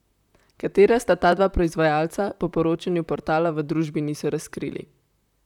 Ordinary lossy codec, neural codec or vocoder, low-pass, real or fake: none; vocoder, 44.1 kHz, 128 mel bands, Pupu-Vocoder; 19.8 kHz; fake